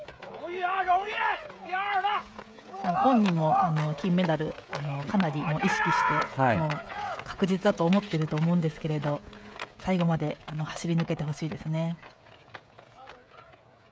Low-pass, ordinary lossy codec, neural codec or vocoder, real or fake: none; none; codec, 16 kHz, 16 kbps, FreqCodec, smaller model; fake